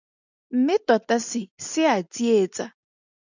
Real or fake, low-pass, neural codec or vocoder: real; 7.2 kHz; none